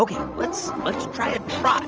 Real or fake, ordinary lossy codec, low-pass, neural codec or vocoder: fake; Opus, 24 kbps; 7.2 kHz; vocoder, 44.1 kHz, 80 mel bands, Vocos